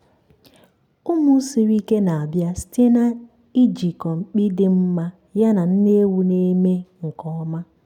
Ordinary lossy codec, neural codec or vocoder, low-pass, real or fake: none; none; 19.8 kHz; real